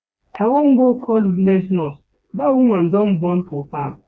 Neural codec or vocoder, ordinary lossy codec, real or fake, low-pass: codec, 16 kHz, 2 kbps, FreqCodec, smaller model; none; fake; none